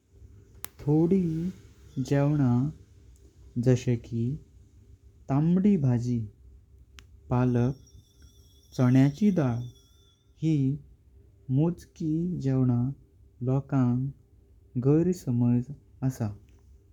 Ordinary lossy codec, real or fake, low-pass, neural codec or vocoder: none; fake; 19.8 kHz; codec, 44.1 kHz, 7.8 kbps, Pupu-Codec